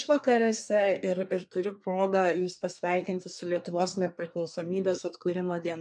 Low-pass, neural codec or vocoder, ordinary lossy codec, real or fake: 9.9 kHz; codec, 24 kHz, 1 kbps, SNAC; MP3, 96 kbps; fake